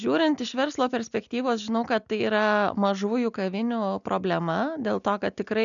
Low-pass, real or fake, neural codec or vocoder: 7.2 kHz; real; none